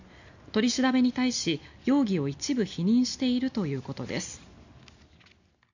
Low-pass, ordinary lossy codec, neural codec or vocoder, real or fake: 7.2 kHz; none; none; real